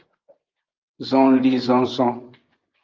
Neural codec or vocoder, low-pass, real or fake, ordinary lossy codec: vocoder, 24 kHz, 100 mel bands, Vocos; 7.2 kHz; fake; Opus, 32 kbps